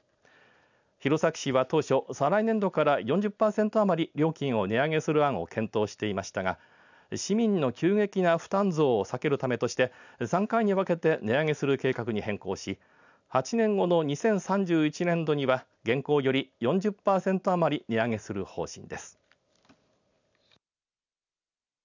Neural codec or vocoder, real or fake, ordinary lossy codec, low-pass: none; real; none; 7.2 kHz